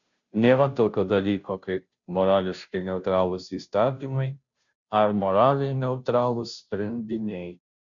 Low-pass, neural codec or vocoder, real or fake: 7.2 kHz; codec, 16 kHz, 0.5 kbps, FunCodec, trained on Chinese and English, 25 frames a second; fake